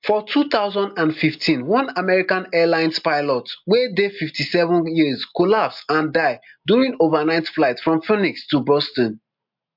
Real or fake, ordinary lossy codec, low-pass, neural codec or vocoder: real; MP3, 48 kbps; 5.4 kHz; none